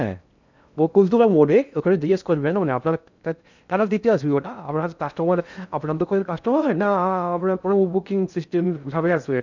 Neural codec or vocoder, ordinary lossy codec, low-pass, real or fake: codec, 16 kHz in and 24 kHz out, 0.6 kbps, FocalCodec, streaming, 2048 codes; none; 7.2 kHz; fake